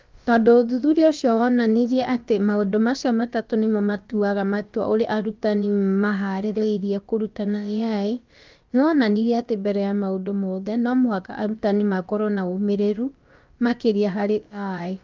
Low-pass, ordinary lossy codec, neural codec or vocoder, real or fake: 7.2 kHz; Opus, 24 kbps; codec, 16 kHz, about 1 kbps, DyCAST, with the encoder's durations; fake